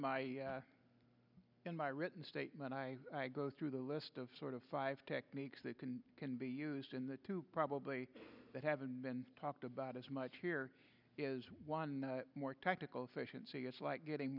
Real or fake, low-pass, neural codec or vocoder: real; 5.4 kHz; none